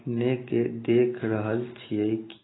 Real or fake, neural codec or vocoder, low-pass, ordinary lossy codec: real; none; 7.2 kHz; AAC, 16 kbps